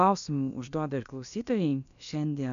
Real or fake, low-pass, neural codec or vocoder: fake; 7.2 kHz; codec, 16 kHz, about 1 kbps, DyCAST, with the encoder's durations